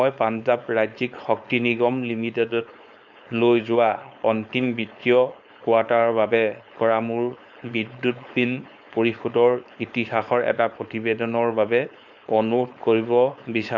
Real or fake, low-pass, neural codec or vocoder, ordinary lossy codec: fake; 7.2 kHz; codec, 16 kHz, 4.8 kbps, FACodec; none